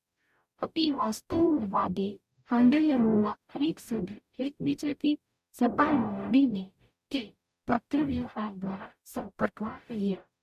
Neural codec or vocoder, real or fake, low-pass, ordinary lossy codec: codec, 44.1 kHz, 0.9 kbps, DAC; fake; 14.4 kHz; MP3, 96 kbps